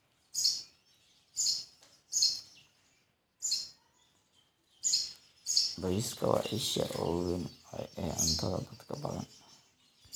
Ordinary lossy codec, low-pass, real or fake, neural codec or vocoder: none; none; real; none